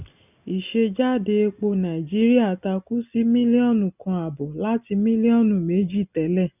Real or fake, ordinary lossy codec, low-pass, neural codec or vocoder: real; none; 3.6 kHz; none